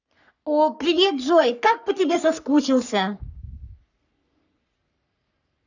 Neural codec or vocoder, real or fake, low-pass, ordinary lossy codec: codec, 44.1 kHz, 3.4 kbps, Pupu-Codec; fake; 7.2 kHz; none